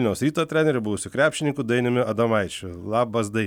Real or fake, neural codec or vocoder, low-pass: real; none; 19.8 kHz